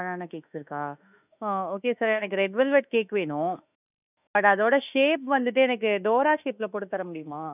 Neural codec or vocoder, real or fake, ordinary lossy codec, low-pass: autoencoder, 48 kHz, 32 numbers a frame, DAC-VAE, trained on Japanese speech; fake; none; 3.6 kHz